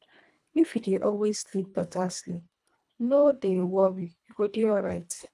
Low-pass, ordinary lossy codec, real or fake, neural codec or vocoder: none; none; fake; codec, 24 kHz, 1.5 kbps, HILCodec